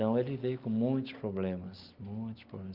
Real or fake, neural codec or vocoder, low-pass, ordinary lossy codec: real; none; 5.4 kHz; Opus, 24 kbps